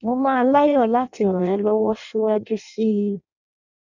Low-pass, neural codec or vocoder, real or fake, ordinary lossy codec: 7.2 kHz; codec, 16 kHz in and 24 kHz out, 0.6 kbps, FireRedTTS-2 codec; fake; none